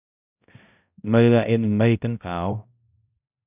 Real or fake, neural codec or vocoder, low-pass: fake; codec, 16 kHz, 0.5 kbps, X-Codec, HuBERT features, trained on balanced general audio; 3.6 kHz